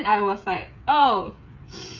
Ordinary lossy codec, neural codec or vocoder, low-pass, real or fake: none; codec, 16 kHz, 8 kbps, FreqCodec, smaller model; 7.2 kHz; fake